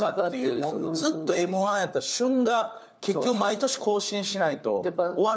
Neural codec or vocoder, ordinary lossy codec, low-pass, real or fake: codec, 16 kHz, 4 kbps, FunCodec, trained on LibriTTS, 50 frames a second; none; none; fake